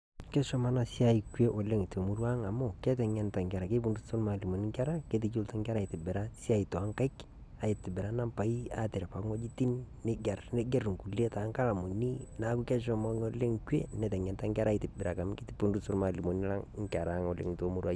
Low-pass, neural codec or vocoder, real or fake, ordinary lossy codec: none; none; real; none